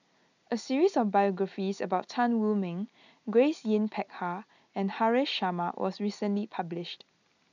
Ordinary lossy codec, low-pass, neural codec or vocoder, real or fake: none; 7.2 kHz; none; real